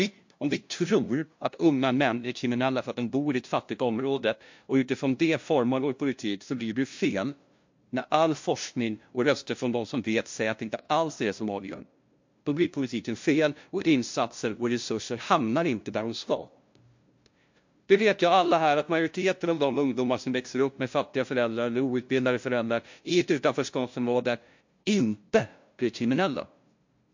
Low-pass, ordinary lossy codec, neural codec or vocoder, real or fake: 7.2 kHz; MP3, 48 kbps; codec, 16 kHz, 0.5 kbps, FunCodec, trained on LibriTTS, 25 frames a second; fake